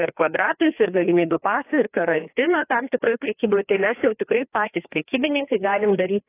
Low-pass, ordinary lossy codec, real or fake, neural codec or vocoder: 3.6 kHz; AAC, 24 kbps; fake; codec, 16 kHz, 2 kbps, FreqCodec, larger model